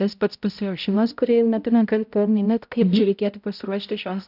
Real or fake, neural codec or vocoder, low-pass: fake; codec, 16 kHz, 0.5 kbps, X-Codec, HuBERT features, trained on balanced general audio; 5.4 kHz